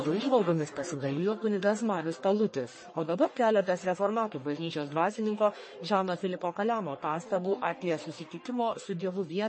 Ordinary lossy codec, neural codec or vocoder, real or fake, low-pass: MP3, 32 kbps; codec, 44.1 kHz, 1.7 kbps, Pupu-Codec; fake; 9.9 kHz